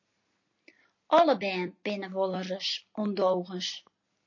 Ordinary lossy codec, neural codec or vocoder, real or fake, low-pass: MP3, 32 kbps; none; real; 7.2 kHz